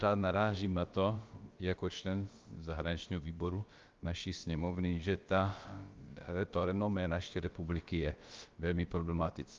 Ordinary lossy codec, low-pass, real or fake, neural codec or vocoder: Opus, 32 kbps; 7.2 kHz; fake; codec, 16 kHz, about 1 kbps, DyCAST, with the encoder's durations